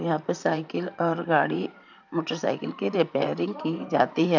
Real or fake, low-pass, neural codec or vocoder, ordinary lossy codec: fake; 7.2 kHz; vocoder, 44.1 kHz, 128 mel bands, Pupu-Vocoder; none